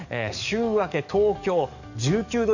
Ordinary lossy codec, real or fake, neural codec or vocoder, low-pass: none; fake; codec, 44.1 kHz, 7.8 kbps, DAC; 7.2 kHz